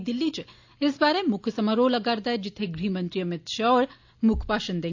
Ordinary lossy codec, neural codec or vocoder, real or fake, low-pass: AAC, 48 kbps; none; real; 7.2 kHz